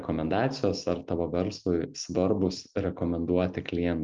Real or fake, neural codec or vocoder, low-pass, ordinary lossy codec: real; none; 7.2 kHz; Opus, 24 kbps